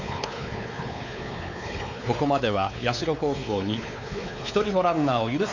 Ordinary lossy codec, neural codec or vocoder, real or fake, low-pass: none; codec, 16 kHz, 4 kbps, X-Codec, WavLM features, trained on Multilingual LibriSpeech; fake; 7.2 kHz